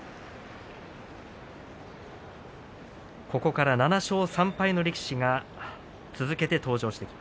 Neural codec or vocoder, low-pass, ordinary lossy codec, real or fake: none; none; none; real